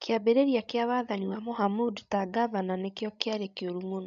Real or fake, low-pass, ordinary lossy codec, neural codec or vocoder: fake; 7.2 kHz; none; codec, 16 kHz, 16 kbps, FreqCodec, larger model